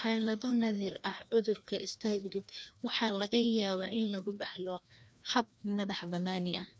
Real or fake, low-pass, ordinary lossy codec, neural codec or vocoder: fake; none; none; codec, 16 kHz, 1 kbps, FreqCodec, larger model